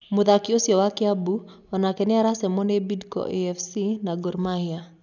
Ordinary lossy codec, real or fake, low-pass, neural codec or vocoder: none; real; 7.2 kHz; none